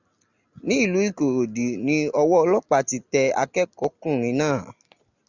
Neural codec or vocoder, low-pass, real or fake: none; 7.2 kHz; real